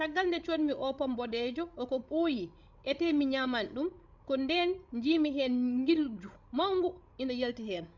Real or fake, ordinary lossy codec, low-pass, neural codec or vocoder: fake; none; 7.2 kHz; codec, 16 kHz, 16 kbps, FreqCodec, larger model